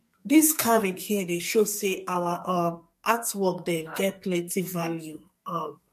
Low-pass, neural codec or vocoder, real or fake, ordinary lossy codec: 14.4 kHz; codec, 44.1 kHz, 2.6 kbps, SNAC; fake; MP3, 64 kbps